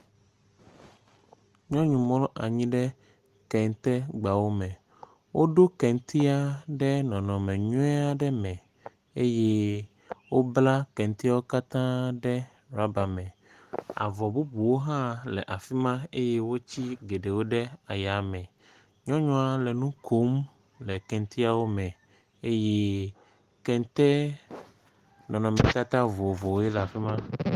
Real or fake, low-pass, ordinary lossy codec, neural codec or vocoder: real; 14.4 kHz; Opus, 24 kbps; none